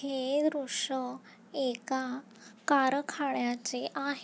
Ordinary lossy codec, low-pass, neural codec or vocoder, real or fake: none; none; none; real